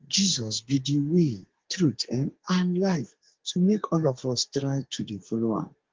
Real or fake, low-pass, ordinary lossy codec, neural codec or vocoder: fake; 7.2 kHz; Opus, 16 kbps; codec, 32 kHz, 1.9 kbps, SNAC